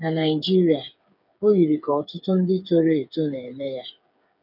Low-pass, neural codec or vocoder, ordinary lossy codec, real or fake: 5.4 kHz; codec, 16 kHz, 8 kbps, FreqCodec, smaller model; none; fake